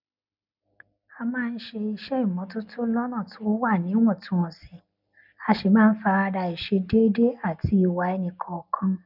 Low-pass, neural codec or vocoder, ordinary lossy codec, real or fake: 5.4 kHz; none; none; real